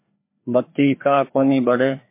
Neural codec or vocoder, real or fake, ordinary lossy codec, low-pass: codec, 16 kHz, 2 kbps, FreqCodec, larger model; fake; MP3, 24 kbps; 3.6 kHz